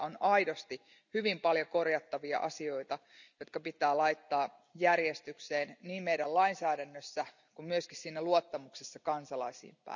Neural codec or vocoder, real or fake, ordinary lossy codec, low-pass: none; real; none; 7.2 kHz